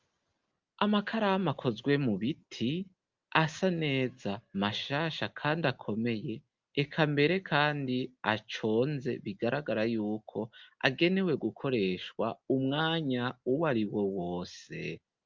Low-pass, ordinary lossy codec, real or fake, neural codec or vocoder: 7.2 kHz; Opus, 24 kbps; real; none